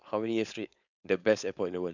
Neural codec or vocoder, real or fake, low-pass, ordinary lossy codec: codec, 16 kHz, 4.8 kbps, FACodec; fake; 7.2 kHz; none